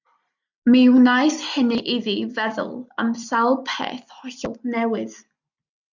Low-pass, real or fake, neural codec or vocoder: 7.2 kHz; real; none